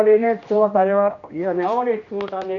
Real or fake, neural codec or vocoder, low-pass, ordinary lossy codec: fake; codec, 16 kHz, 1 kbps, X-Codec, HuBERT features, trained on general audio; 7.2 kHz; none